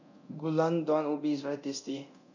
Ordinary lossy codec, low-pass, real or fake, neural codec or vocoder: none; 7.2 kHz; fake; codec, 24 kHz, 0.9 kbps, DualCodec